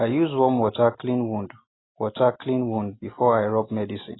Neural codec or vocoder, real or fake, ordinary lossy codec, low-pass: none; real; AAC, 16 kbps; 7.2 kHz